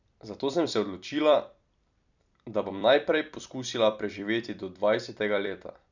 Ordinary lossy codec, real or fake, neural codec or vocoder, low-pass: none; fake; vocoder, 44.1 kHz, 128 mel bands every 256 samples, BigVGAN v2; 7.2 kHz